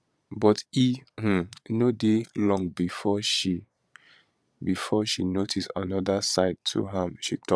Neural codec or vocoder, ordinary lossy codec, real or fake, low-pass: vocoder, 22.05 kHz, 80 mel bands, Vocos; none; fake; none